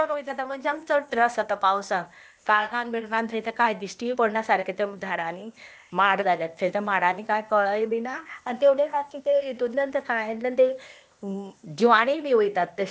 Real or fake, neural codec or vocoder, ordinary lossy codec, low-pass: fake; codec, 16 kHz, 0.8 kbps, ZipCodec; none; none